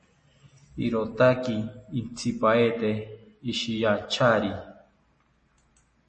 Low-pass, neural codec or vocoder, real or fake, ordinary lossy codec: 10.8 kHz; none; real; MP3, 32 kbps